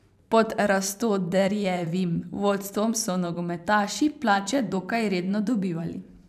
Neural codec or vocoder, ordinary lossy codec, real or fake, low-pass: vocoder, 44.1 kHz, 128 mel bands every 512 samples, BigVGAN v2; none; fake; 14.4 kHz